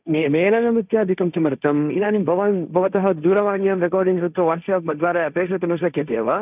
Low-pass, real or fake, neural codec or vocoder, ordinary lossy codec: 3.6 kHz; fake; codec, 16 kHz, 1.1 kbps, Voila-Tokenizer; none